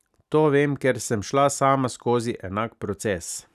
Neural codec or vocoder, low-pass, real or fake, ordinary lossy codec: none; 14.4 kHz; real; none